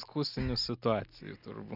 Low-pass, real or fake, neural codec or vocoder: 5.4 kHz; real; none